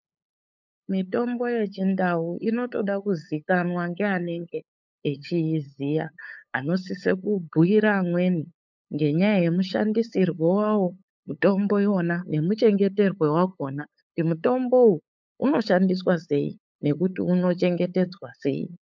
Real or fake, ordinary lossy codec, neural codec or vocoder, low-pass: fake; MP3, 64 kbps; codec, 16 kHz, 8 kbps, FunCodec, trained on LibriTTS, 25 frames a second; 7.2 kHz